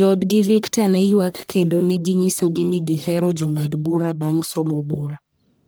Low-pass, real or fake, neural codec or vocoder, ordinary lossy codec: none; fake; codec, 44.1 kHz, 1.7 kbps, Pupu-Codec; none